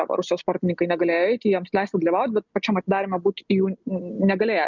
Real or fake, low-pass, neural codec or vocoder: real; 7.2 kHz; none